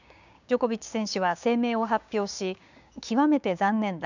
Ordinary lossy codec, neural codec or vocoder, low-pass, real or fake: none; codec, 16 kHz, 6 kbps, DAC; 7.2 kHz; fake